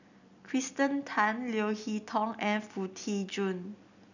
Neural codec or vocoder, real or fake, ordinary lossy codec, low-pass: none; real; none; 7.2 kHz